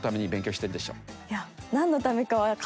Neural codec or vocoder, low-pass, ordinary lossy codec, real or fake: none; none; none; real